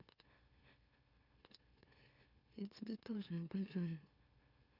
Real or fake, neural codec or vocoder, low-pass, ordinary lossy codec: fake; autoencoder, 44.1 kHz, a latent of 192 numbers a frame, MeloTTS; 5.4 kHz; Opus, 64 kbps